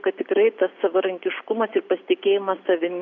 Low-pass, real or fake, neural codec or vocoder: 7.2 kHz; real; none